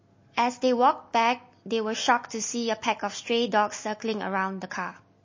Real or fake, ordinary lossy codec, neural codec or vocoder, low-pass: real; MP3, 32 kbps; none; 7.2 kHz